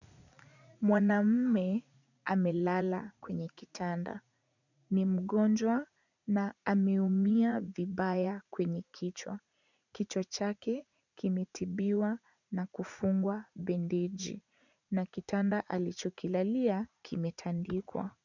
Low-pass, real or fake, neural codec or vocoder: 7.2 kHz; fake; vocoder, 44.1 kHz, 80 mel bands, Vocos